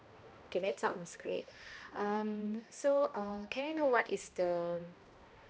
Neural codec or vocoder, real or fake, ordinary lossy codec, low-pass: codec, 16 kHz, 1 kbps, X-Codec, HuBERT features, trained on balanced general audio; fake; none; none